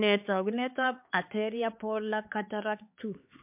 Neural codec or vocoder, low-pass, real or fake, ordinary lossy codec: codec, 16 kHz, 4 kbps, X-Codec, HuBERT features, trained on LibriSpeech; 3.6 kHz; fake; MP3, 32 kbps